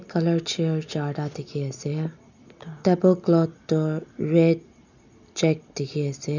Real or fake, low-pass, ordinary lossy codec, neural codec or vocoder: real; 7.2 kHz; none; none